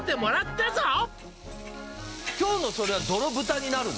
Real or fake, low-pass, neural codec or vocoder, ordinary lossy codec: real; none; none; none